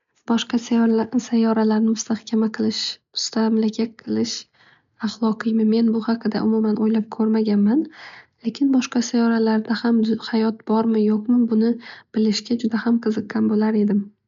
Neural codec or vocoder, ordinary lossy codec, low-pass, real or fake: none; MP3, 64 kbps; 7.2 kHz; real